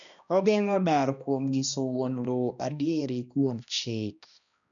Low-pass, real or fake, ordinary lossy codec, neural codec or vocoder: 7.2 kHz; fake; none; codec, 16 kHz, 1 kbps, X-Codec, HuBERT features, trained on balanced general audio